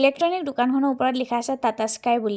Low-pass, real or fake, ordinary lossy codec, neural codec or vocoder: none; real; none; none